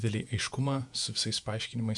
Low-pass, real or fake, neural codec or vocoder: 10.8 kHz; fake; vocoder, 48 kHz, 128 mel bands, Vocos